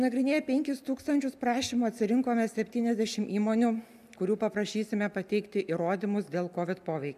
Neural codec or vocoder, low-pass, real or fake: none; 14.4 kHz; real